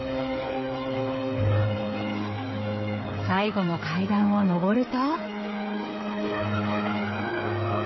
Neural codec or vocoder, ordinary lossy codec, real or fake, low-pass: codec, 16 kHz, 8 kbps, FreqCodec, smaller model; MP3, 24 kbps; fake; 7.2 kHz